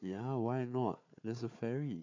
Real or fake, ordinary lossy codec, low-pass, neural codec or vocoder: fake; MP3, 48 kbps; 7.2 kHz; codec, 16 kHz, 4 kbps, FunCodec, trained on Chinese and English, 50 frames a second